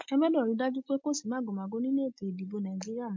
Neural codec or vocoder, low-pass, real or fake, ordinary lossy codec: none; 7.2 kHz; real; MP3, 48 kbps